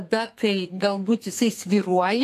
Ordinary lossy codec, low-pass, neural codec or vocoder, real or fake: AAC, 64 kbps; 14.4 kHz; codec, 32 kHz, 1.9 kbps, SNAC; fake